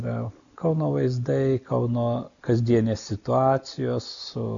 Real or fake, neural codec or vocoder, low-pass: real; none; 7.2 kHz